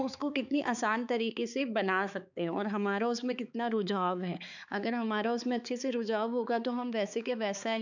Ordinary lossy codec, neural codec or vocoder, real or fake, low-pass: none; codec, 16 kHz, 4 kbps, X-Codec, HuBERT features, trained on balanced general audio; fake; 7.2 kHz